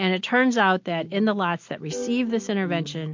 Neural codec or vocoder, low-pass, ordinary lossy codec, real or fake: none; 7.2 kHz; MP3, 48 kbps; real